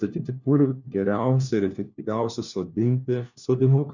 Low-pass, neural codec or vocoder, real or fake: 7.2 kHz; codec, 16 kHz, 1 kbps, FunCodec, trained on LibriTTS, 50 frames a second; fake